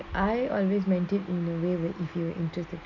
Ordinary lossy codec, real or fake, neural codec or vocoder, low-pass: none; real; none; 7.2 kHz